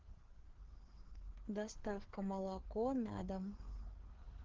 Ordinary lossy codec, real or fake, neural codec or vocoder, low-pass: Opus, 16 kbps; fake; codec, 16 kHz, 4 kbps, FreqCodec, larger model; 7.2 kHz